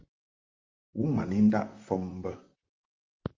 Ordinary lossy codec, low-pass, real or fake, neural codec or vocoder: Opus, 32 kbps; 7.2 kHz; fake; vocoder, 44.1 kHz, 128 mel bands, Pupu-Vocoder